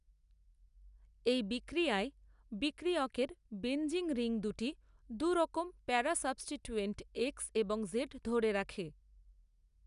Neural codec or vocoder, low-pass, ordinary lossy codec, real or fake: none; 10.8 kHz; none; real